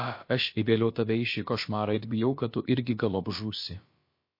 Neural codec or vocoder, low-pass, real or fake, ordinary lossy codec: codec, 16 kHz, about 1 kbps, DyCAST, with the encoder's durations; 5.4 kHz; fake; MP3, 32 kbps